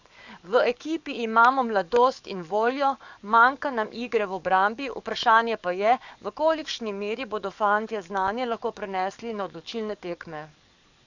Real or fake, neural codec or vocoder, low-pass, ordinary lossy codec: fake; codec, 44.1 kHz, 7.8 kbps, DAC; 7.2 kHz; none